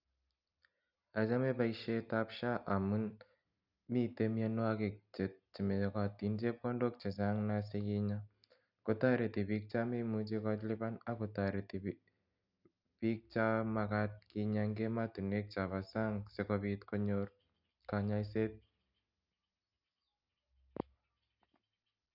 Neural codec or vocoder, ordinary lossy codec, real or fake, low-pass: none; none; real; 5.4 kHz